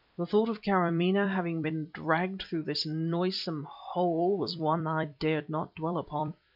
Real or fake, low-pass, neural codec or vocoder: fake; 5.4 kHz; vocoder, 44.1 kHz, 128 mel bands every 256 samples, BigVGAN v2